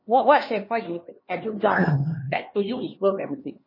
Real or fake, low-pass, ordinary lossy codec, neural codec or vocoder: fake; 5.4 kHz; MP3, 24 kbps; codec, 16 kHz, 2 kbps, X-Codec, HuBERT features, trained on LibriSpeech